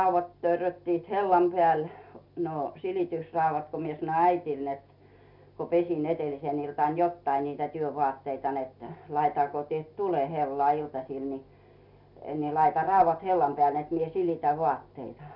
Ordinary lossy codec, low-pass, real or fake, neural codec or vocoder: none; 5.4 kHz; real; none